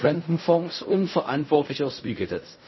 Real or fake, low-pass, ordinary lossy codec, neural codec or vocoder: fake; 7.2 kHz; MP3, 24 kbps; codec, 16 kHz in and 24 kHz out, 0.4 kbps, LongCat-Audio-Codec, fine tuned four codebook decoder